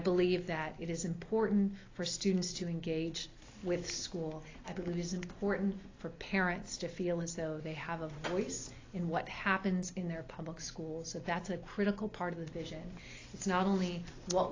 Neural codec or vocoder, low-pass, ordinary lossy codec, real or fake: none; 7.2 kHz; AAC, 32 kbps; real